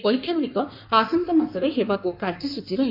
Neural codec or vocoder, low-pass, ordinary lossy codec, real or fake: codec, 16 kHz in and 24 kHz out, 1.1 kbps, FireRedTTS-2 codec; 5.4 kHz; none; fake